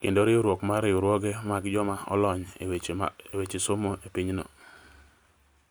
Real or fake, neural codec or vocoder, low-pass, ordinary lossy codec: real; none; none; none